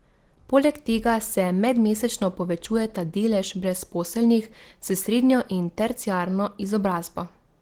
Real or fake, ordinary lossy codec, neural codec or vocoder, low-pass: real; Opus, 16 kbps; none; 19.8 kHz